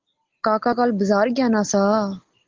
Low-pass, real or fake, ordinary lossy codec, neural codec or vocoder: 7.2 kHz; real; Opus, 16 kbps; none